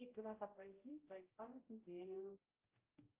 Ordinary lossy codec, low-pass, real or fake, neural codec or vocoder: Opus, 24 kbps; 3.6 kHz; fake; codec, 16 kHz, 0.5 kbps, X-Codec, HuBERT features, trained on general audio